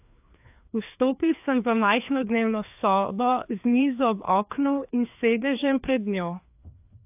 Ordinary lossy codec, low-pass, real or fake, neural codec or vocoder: AAC, 32 kbps; 3.6 kHz; fake; codec, 16 kHz, 2 kbps, FreqCodec, larger model